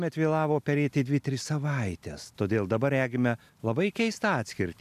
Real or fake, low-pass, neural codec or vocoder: real; 14.4 kHz; none